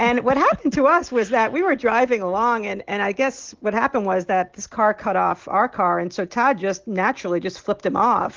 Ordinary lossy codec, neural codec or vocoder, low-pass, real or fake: Opus, 16 kbps; none; 7.2 kHz; real